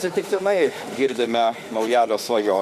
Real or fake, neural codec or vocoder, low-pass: fake; autoencoder, 48 kHz, 32 numbers a frame, DAC-VAE, trained on Japanese speech; 14.4 kHz